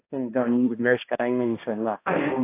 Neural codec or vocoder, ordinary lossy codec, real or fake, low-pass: codec, 16 kHz, 1 kbps, X-Codec, HuBERT features, trained on balanced general audio; AAC, 24 kbps; fake; 3.6 kHz